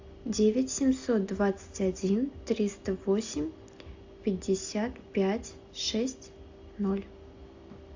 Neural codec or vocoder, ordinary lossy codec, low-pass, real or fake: none; AAC, 48 kbps; 7.2 kHz; real